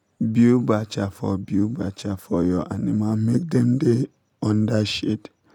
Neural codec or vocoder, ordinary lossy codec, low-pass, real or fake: none; none; 19.8 kHz; real